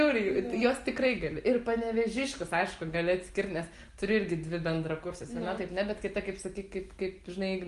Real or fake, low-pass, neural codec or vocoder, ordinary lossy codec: real; 10.8 kHz; none; Opus, 24 kbps